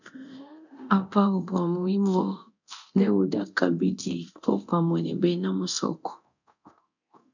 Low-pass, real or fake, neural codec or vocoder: 7.2 kHz; fake; codec, 24 kHz, 0.9 kbps, DualCodec